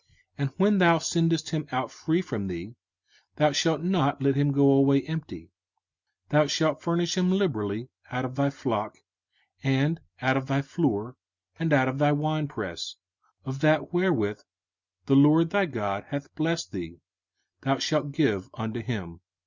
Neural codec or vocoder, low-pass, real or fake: none; 7.2 kHz; real